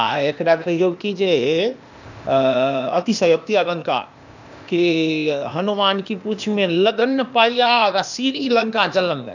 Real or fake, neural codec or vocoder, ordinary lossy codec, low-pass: fake; codec, 16 kHz, 0.8 kbps, ZipCodec; none; 7.2 kHz